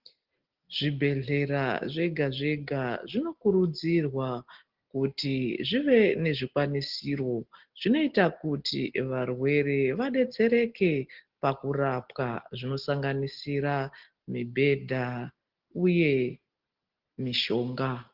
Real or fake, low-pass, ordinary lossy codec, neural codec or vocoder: real; 5.4 kHz; Opus, 16 kbps; none